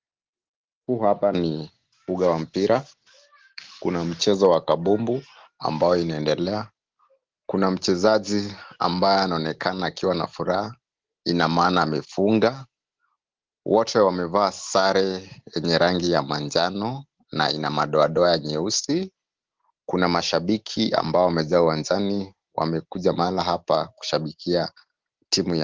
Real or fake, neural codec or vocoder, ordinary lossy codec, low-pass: real; none; Opus, 16 kbps; 7.2 kHz